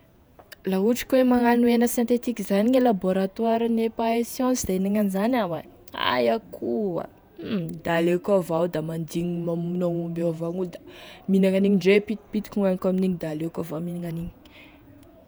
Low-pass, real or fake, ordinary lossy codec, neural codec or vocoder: none; fake; none; vocoder, 48 kHz, 128 mel bands, Vocos